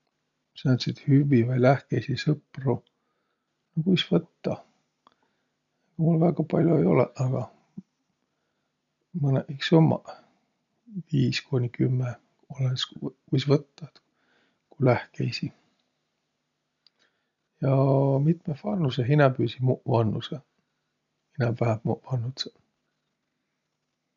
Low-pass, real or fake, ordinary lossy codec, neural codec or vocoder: 7.2 kHz; real; none; none